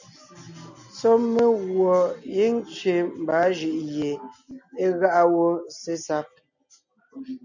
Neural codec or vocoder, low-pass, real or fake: none; 7.2 kHz; real